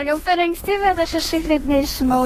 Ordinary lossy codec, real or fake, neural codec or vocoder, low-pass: AAC, 48 kbps; fake; codec, 32 kHz, 1.9 kbps, SNAC; 14.4 kHz